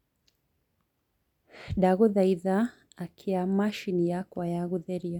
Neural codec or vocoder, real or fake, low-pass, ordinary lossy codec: none; real; 19.8 kHz; none